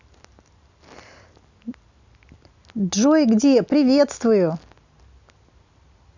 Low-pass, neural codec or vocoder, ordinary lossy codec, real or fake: 7.2 kHz; none; none; real